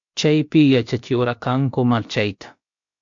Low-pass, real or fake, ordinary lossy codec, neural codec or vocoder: 7.2 kHz; fake; MP3, 48 kbps; codec, 16 kHz, about 1 kbps, DyCAST, with the encoder's durations